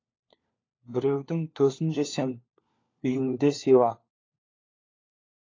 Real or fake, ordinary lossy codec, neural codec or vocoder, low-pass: fake; AAC, 32 kbps; codec, 16 kHz, 4 kbps, FunCodec, trained on LibriTTS, 50 frames a second; 7.2 kHz